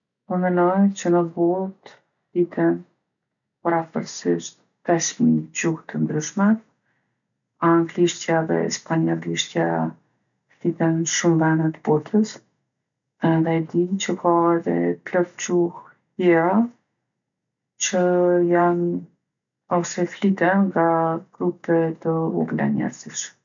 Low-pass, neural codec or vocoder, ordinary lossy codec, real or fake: 7.2 kHz; none; none; real